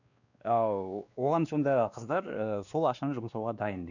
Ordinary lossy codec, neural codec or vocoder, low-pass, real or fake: none; codec, 16 kHz, 2 kbps, X-Codec, WavLM features, trained on Multilingual LibriSpeech; 7.2 kHz; fake